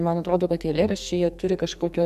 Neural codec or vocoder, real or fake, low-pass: codec, 44.1 kHz, 2.6 kbps, SNAC; fake; 14.4 kHz